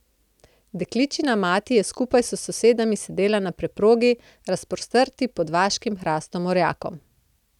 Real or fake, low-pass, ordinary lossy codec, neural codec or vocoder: real; 19.8 kHz; none; none